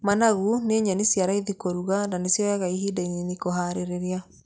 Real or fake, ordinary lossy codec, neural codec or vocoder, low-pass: real; none; none; none